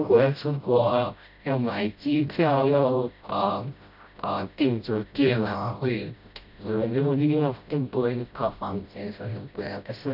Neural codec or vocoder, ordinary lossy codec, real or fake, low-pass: codec, 16 kHz, 0.5 kbps, FreqCodec, smaller model; none; fake; 5.4 kHz